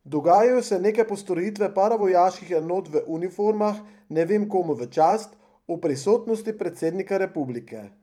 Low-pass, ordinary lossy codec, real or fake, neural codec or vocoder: 19.8 kHz; none; fake; vocoder, 44.1 kHz, 128 mel bands every 512 samples, BigVGAN v2